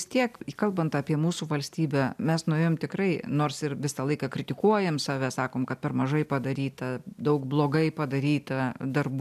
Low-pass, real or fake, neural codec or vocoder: 14.4 kHz; real; none